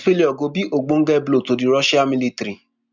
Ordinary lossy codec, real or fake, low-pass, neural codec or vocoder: none; real; 7.2 kHz; none